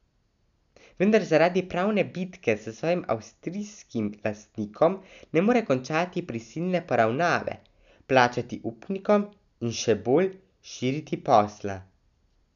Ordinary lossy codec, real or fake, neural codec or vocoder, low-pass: none; real; none; 7.2 kHz